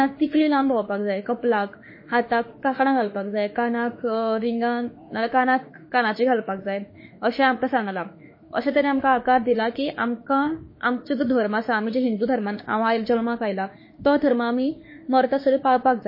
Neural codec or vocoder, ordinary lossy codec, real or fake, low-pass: autoencoder, 48 kHz, 32 numbers a frame, DAC-VAE, trained on Japanese speech; MP3, 24 kbps; fake; 5.4 kHz